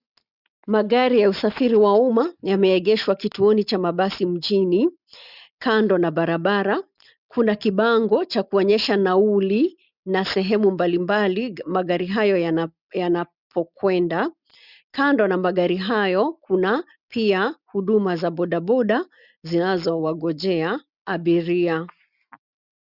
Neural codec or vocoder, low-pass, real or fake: none; 5.4 kHz; real